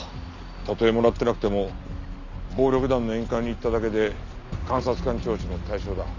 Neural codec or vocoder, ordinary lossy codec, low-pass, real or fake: none; none; 7.2 kHz; real